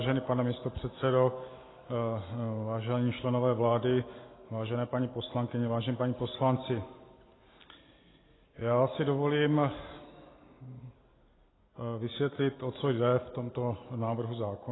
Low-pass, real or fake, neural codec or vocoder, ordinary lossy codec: 7.2 kHz; real; none; AAC, 16 kbps